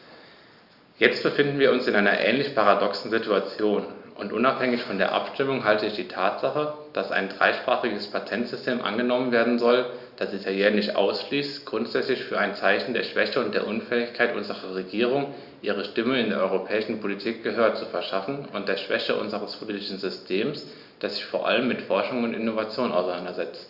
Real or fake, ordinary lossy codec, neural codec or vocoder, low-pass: real; Opus, 64 kbps; none; 5.4 kHz